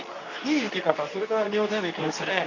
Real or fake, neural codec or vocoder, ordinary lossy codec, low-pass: fake; codec, 24 kHz, 0.9 kbps, WavTokenizer, medium speech release version 2; none; 7.2 kHz